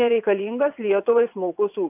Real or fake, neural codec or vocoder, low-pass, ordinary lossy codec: fake; vocoder, 22.05 kHz, 80 mel bands, WaveNeXt; 3.6 kHz; AAC, 32 kbps